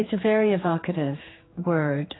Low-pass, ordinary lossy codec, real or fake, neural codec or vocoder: 7.2 kHz; AAC, 16 kbps; fake; codec, 16 kHz, 4 kbps, X-Codec, HuBERT features, trained on general audio